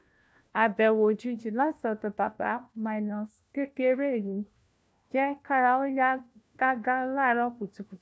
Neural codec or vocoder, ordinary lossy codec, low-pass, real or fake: codec, 16 kHz, 1 kbps, FunCodec, trained on LibriTTS, 50 frames a second; none; none; fake